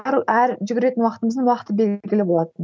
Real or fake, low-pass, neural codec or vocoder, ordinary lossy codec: real; none; none; none